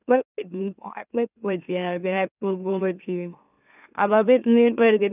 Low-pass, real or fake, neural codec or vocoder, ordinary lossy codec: 3.6 kHz; fake; autoencoder, 44.1 kHz, a latent of 192 numbers a frame, MeloTTS; none